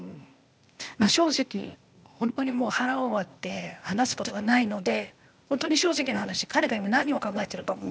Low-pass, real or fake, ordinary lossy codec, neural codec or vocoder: none; fake; none; codec, 16 kHz, 0.8 kbps, ZipCodec